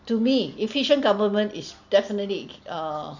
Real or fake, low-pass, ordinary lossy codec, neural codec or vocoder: real; 7.2 kHz; none; none